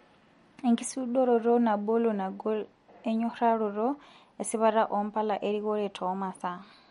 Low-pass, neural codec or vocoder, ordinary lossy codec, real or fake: 19.8 kHz; none; MP3, 48 kbps; real